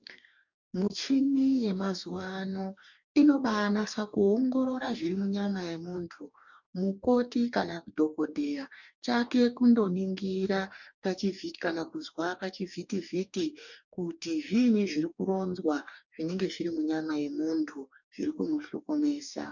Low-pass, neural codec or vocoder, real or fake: 7.2 kHz; codec, 44.1 kHz, 2.6 kbps, DAC; fake